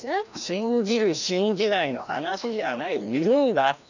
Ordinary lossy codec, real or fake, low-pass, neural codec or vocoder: none; fake; 7.2 kHz; codec, 16 kHz, 1 kbps, FreqCodec, larger model